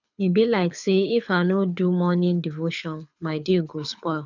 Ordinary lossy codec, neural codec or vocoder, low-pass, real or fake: none; codec, 24 kHz, 6 kbps, HILCodec; 7.2 kHz; fake